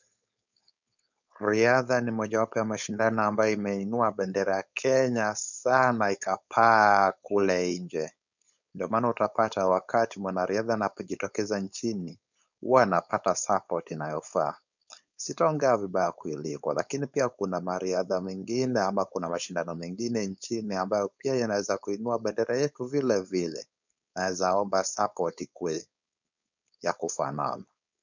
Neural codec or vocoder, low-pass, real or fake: codec, 16 kHz, 4.8 kbps, FACodec; 7.2 kHz; fake